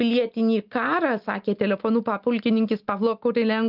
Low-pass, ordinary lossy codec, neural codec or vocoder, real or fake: 5.4 kHz; Opus, 24 kbps; none; real